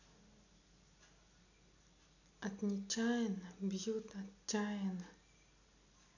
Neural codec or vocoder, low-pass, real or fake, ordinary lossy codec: none; 7.2 kHz; real; none